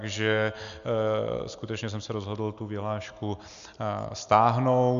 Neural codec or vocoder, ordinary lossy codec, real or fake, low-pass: none; AAC, 96 kbps; real; 7.2 kHz